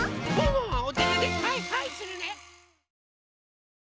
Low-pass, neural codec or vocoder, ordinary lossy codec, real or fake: none; none; none; real